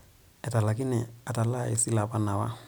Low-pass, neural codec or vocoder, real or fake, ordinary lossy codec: none; none; real; none